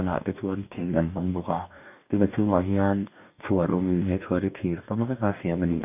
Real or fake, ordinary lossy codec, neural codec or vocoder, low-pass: fake; none; codec, 44.1 kHz, 2.6 kbps, DAC; 3.6 kHz